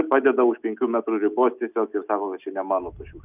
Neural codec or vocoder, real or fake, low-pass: none; real; 3.6 kHz